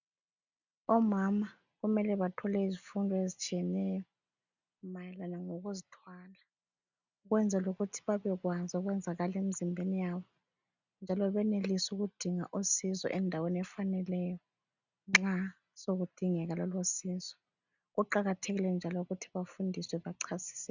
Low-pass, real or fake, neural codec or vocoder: 7.2 kHz; real; none